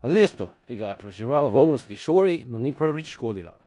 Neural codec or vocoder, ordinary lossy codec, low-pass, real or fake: codec, 16 kHz in and 24 kHz out, 0.4 kbps, LongCat-Audio-Codec, four codebook decoder; none; 10.8 kHz; fake